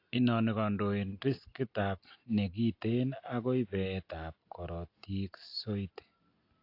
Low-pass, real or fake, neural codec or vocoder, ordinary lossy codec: 5.4 kHz; real; none; AAC, 32 kbps